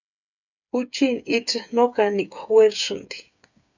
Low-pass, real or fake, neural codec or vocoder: 7.2 kHz; fake; codec, 16 kHz, 8 kbps, FreqCodec, smaller model